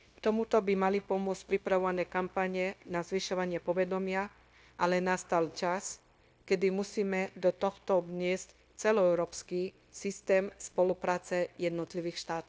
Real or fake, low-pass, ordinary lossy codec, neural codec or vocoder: fake; none; none; codec, 16 kHz, 0.9 kbps, LongCat-Audio-Codec